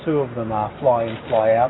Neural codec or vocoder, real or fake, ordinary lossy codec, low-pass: none; real; AAC, 16 kbps; 7.2 kHz